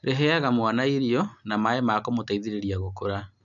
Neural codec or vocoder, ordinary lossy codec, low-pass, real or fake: none; none; 7.2 kHz; real